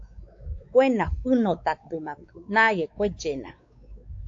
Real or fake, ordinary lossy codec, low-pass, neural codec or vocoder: fake; AAC, 48 kbps; 7.2 kHz; codec, 16 kHz, 4 kbps, X-Codec, WavLM features, trained on Multilingual LibriSpeech